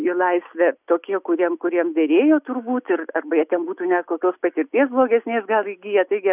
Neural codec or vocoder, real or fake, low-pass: none; real; 3.6 kHz